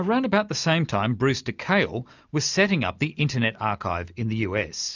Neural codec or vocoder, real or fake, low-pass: none; real; 7.2 kHz